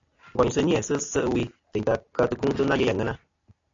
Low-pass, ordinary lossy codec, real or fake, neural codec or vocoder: 7.2 kHz; AAC, 48 kbps; real; none